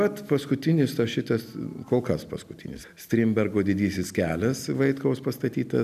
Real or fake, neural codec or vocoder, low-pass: real; none; 14.4 kHz